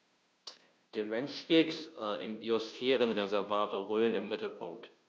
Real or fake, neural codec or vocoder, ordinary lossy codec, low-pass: fake; codec, 16 kHz, 0.5 kbps, FunCodec, trained on Chinese and English, 25 frames a second; none; none